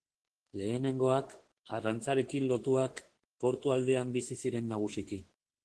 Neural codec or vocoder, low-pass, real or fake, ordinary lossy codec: autoencoder, 48 kHz, 32 numbers a frame, DAC-VAE, trained on Japanese speech; 10.8 kHz; fake; Opus, 16 kbps